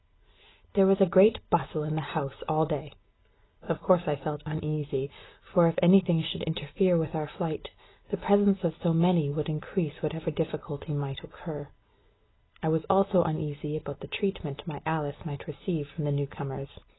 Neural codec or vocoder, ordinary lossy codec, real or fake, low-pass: none; AAC, 16 kbps; real; 7.2 kHz